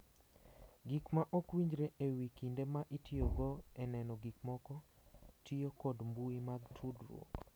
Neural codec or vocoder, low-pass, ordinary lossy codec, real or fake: none; none; none; real